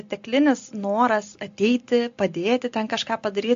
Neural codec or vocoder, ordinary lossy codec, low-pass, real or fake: none; AAC, 64 kbps; 7.2 kHz; real